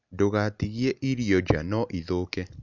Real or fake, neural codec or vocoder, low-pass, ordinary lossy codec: real; none; 7.2 kHz; none